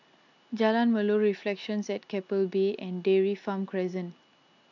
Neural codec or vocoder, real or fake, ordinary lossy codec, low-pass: none; real; none; 7.2 kHz